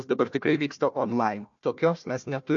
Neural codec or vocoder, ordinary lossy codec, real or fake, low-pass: codec, 16 kHz, 1 kbps, FunCodec, trained on Chinese and English, 50 frames a second; MP3, 48 kbps; fake; 7.2 kHz